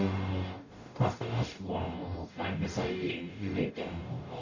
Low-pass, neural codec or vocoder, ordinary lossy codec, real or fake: 7.2 kHz; codec, 44.1 kHz, 0.9 kbps, DAC; none; fake